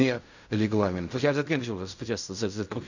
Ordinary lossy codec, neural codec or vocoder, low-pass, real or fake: none; codec, 16 kHz in and 24 kHz out, 0.4 kbps, LongCat-Audio-Codec, fine tuned four codebook decoder; 7.2 kHz; fake